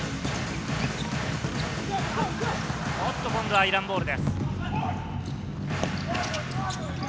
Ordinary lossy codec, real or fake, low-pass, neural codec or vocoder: none; real; none; none